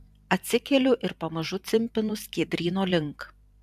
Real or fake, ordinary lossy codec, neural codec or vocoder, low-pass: fake; AAC, 96 kbps; vocoder, 44.1 kHz, 128 mel bands every 512 samples, BigVGAN v2; 14.4 kHz